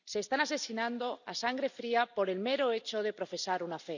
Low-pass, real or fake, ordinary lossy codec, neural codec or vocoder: 7.2 kHz; real; none; none